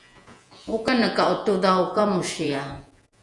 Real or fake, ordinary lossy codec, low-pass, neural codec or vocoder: fake; Opus, 64 kbps; 10.8 kHz; vocoder, 48 kHz, 128 mel bands, Vocos